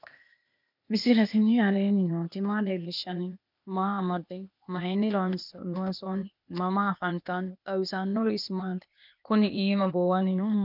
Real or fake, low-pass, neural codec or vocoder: fake; 5.4 kHz; codec, 16 kHz, 0.8 kbps, ZipCodec